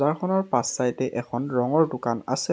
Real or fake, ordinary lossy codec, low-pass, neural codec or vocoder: real; none; none; none